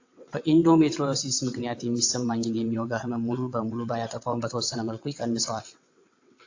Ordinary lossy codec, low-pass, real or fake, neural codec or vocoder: AAC, 48 kbps; 7.2 kHz; fake; vocoder, 22.05 kHz, 80 mel bands, WaveNeXt